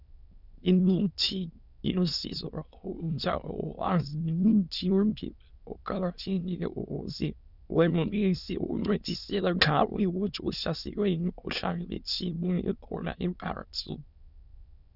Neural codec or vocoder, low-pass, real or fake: autoencoder, 22.05 kHz, a latent of 192 numbers a frame, VITS, trained on many speakers; 5.4 kHz; fake